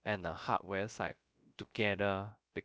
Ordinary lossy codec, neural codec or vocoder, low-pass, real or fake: none; codec, 16 kHz, about 1 kbps, DyCAST, with the encoder's durations; none; fake